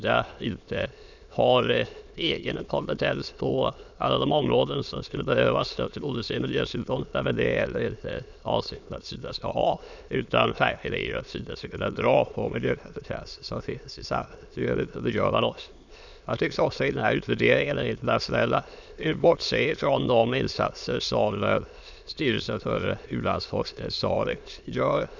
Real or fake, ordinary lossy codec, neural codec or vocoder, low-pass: fake; none; autoencoder, 22.05 kHz, a latent of 192 numbers a frame, VITS, trained on many speakers; 7.2 kHz